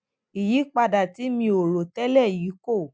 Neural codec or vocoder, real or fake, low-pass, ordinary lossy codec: none; real; none; none